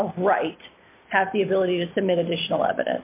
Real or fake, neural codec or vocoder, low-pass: real; none; 3.6 kHz